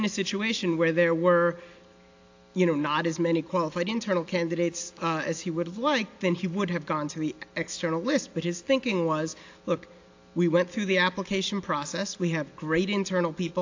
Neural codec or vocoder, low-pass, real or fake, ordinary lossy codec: none; 7.2 kHz; real; AAC, 48 kbps